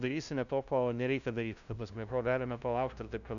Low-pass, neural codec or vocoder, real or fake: 7.2 kHz; codec, 16 kHz, 0.5 kbps, FunCodec, trained on LibriTTS, 25 frames a second; fake